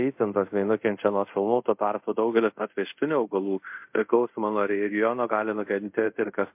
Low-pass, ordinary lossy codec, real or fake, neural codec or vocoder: 3.6 kHz; MP3, 32 kbps; fake; codec, 24 kHz, 0.5 kbps, DualCodec